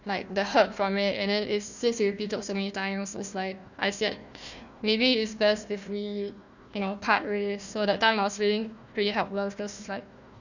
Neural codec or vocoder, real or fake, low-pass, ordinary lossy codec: codec, 16 kHz, 1 kbps, FunCodec, trained on Chinese and English, 50 frames a second; fake; 7.2 kHz; none